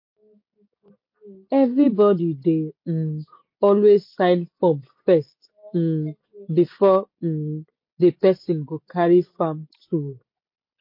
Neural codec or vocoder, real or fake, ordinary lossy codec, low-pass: none; real; MP3, 32 kbps; 5.4 kHz